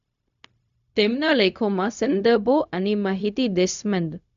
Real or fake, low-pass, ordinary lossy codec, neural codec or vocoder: fake; 7.2 kHz; none; codec, 16 kHz, 0.4 kbps, LongCat-Audio-Codec